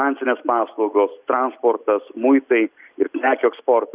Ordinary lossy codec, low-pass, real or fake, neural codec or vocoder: Opus, 64 kbps; 3.6 kHz; real; none